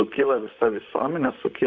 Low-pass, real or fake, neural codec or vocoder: 7.2 kHz; fake; vocoder, 44.1 kHz, 128 mel bands, Pupu-Vocoder